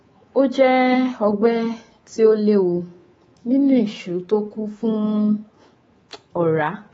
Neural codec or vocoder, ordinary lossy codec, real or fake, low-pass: codec, 24 kHz, 3.1 kbps, DualCodec; AAC, 24 kbps; fake; 10.8 kHz